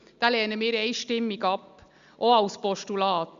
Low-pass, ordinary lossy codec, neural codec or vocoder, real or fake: 7.2 kHz; Opus, 64 kbps; none; real